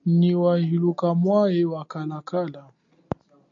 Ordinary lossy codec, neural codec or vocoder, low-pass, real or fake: AAC, 64 kbps; none; 7.2 kHz; real